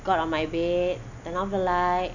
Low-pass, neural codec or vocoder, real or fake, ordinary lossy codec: 7.2 kHz; none; real; none